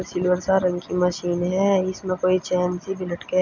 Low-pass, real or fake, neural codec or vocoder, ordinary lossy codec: 7.2 kHz; real; none; none